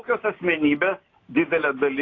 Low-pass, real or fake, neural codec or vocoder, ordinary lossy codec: 7.2 kHz; real; none; AAC, 32 kbps